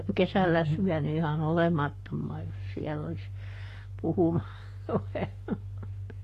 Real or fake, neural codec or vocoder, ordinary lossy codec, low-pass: fake; codec, 44.1 kHz, 7.8 kbps, Pupu-Codec; AAC, 48 kbps; 14.4 kHz